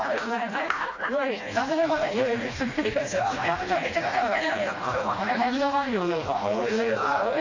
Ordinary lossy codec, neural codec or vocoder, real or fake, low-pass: AAC, 32 kbps; codec, 16 kHz, 1 kbps, FreqCodec, smaller model; fake; 7.2 kHz